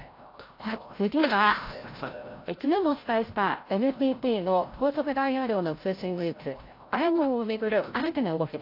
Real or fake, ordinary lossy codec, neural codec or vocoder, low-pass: fake; AAC, 32 kbps; codec, 16 kHz, 0.5 kbps, FreqCodec, larger model; 5.4 kHz